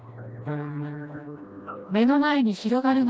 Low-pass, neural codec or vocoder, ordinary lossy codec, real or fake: none; codec, 16 kHz, 1 kbps, FreqCodec, smaller model; none; fake